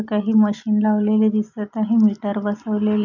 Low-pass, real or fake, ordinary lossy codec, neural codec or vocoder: 7.2 kHz; real; none; none